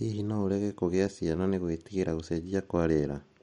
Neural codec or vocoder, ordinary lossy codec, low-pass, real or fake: none; MP3, 48 kbps; 19.8 kHz; real